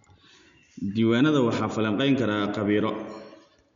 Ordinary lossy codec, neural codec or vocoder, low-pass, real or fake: MP3, 64 kbps; none; 7.2 kHz; real